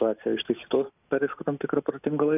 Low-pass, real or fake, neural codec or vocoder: 3.6 kHz; real; none